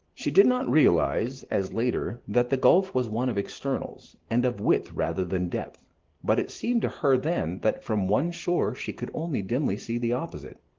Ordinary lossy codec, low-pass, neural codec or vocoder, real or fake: Opus, 16 kbps; 7.2 kHz; none; real